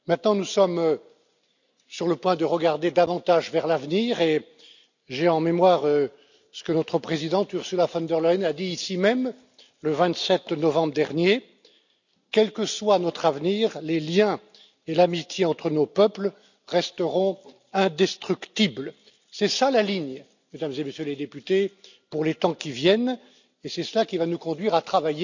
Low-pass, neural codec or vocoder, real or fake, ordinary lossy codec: 7.2 kHz; none; real; none